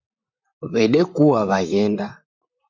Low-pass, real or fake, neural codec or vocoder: 7.2 kHz; fake; vocoder, 44.1 kHz, 128 mel bands, Pupu-Vocoder